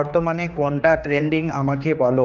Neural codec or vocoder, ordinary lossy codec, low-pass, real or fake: codec, 16 kHz, 2 kbps, X-Codec, HuBERT features, trained on general audio; none; 7.2 kHz; fake